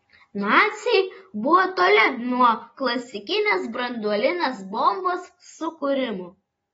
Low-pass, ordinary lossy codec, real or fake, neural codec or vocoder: 19.8 kHz; AAC, 24 kbps; real; none